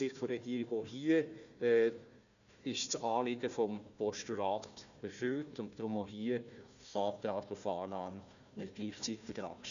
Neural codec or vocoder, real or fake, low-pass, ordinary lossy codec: codec, 16 kHz, 1 kbps, FunCodec, trained on Chinese and English, 50 frames a second; fake; 7.2 kHz; none